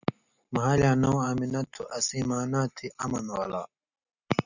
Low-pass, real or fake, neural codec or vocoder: 7.2 kHz; real; none